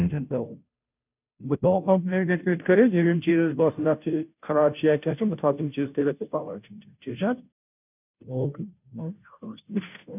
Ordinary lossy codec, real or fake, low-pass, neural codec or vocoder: none; fake; 3.6 kHz; codec, 16 kHz, 0.5 kbps, FunCodec, trained on Chinese and English, 25 frames a second